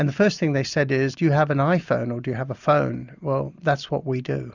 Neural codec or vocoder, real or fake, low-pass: none; real; 7.2 kHz